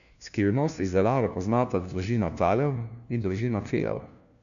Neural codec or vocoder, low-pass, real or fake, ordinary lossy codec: codec, 16 kHz, 1 kbps, FunCodec, trained on LibriTTS, 50 frames a second; 7.2 kHz; fake; none